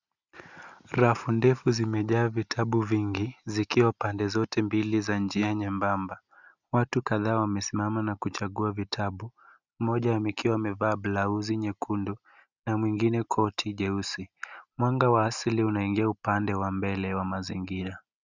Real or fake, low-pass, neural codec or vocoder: real; 7.2 kHz; none